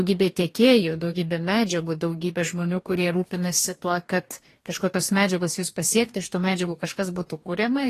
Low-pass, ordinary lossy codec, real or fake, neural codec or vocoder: 14.4 kHz; AAC, 48 kbps; fake; codec, 44.1 kHz, 2.6 kbps, DAC